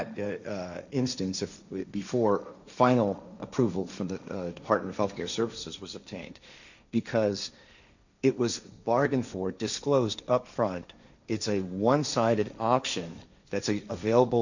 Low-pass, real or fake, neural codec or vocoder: 7.2 kHz; fake; codec, 16 kHz, 1.1 kbps, Voila-Tokenizer